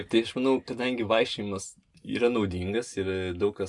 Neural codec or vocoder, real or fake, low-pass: none; real; 10.8 kHz